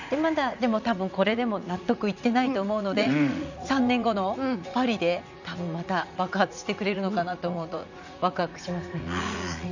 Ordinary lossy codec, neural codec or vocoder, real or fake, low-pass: none; vocoder, 44.1 kHz, 80 mel bands, Vocos; fake; 7.2 kHz